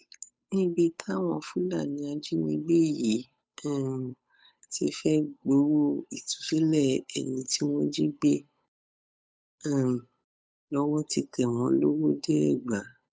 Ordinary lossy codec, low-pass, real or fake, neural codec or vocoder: none; none; fake; codec, 16 kHz, 8 kbps, FunCodec, trained on Chinese and English, 25 frames a second